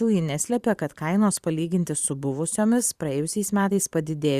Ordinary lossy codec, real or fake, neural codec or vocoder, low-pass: Opus, 64 kbps; fake; vocoder, 44.1 kHz, 128 mel bands every 512 samples, BigVGAN v2; 14.4 kHz